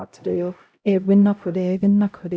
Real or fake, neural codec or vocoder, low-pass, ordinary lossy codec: fake; codec, 16 kHz, 0.5 kbps, X-Codec, HuBERT features, trained on LibriSpeech; none; none